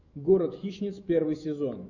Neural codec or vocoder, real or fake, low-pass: autoencoder, 48 kHz, 128 numbers a frame, DAC-VAE, trained on Japanese speech; fake; 7.2 kHz